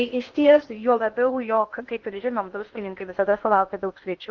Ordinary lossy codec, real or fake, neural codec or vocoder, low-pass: Opus, 24 kbps; fake; codec, 16 kHz in and 24 kHz out, 0.8 kbps, FocalCodec, streaming, 65536 codes; 7.2 kHz